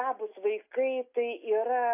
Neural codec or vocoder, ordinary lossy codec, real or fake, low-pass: none; AAC, 32 kbps; real; 3.6 kHz